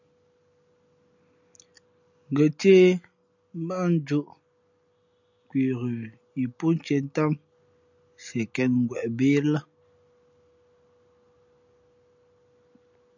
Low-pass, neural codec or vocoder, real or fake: 7.2 kHz; none; real